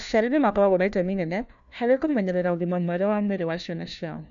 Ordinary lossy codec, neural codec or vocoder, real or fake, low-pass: none; codec, 16 kHz, 1 kbps, FunCodec, trained on LibriTTS, 50 frames a second; fake; 7.2 kHz